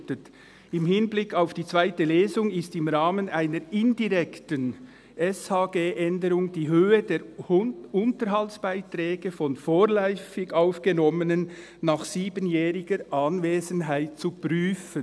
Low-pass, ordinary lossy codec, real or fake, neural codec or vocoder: none; none; real; none